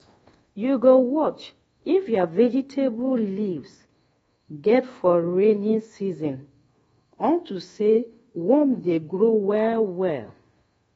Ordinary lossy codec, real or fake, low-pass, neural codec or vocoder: AAC, 24 kbps; fake; 10.8 kHz; codec, 24 kHz, 1.2 kbps, DualCodec